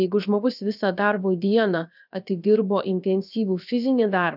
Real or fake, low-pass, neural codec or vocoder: fake; 5.4 kHz; codec, 16 kHz, 0.7 kbps, FocalCodec